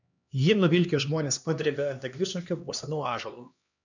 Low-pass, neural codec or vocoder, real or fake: 7.2 kHz; codec, 16 kHz, 2 kbps, X-Codec, HuBERT features, trained on LibriSpeech; fake